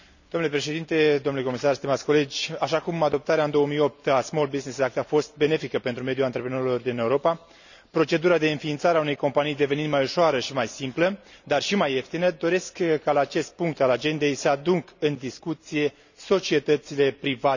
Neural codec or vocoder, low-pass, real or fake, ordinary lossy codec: none; 7.2 kHz; real; none